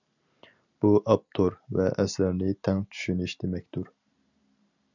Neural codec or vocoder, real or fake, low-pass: none; real; 7.2 kHz